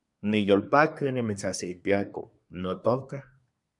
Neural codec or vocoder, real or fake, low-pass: codec, 24 kHz, 1 kbps, SNAC; fake; 10.8 kHz